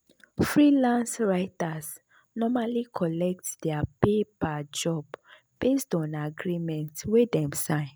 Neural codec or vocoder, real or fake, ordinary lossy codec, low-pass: none; real; none; none